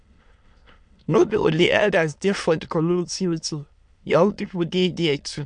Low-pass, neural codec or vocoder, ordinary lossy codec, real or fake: 9.9 kHz; autoencoder, 22.05 kHz, a latent of 192 numbers a frame, VITS, trained on many speakers; MP3, 64 kbps; fake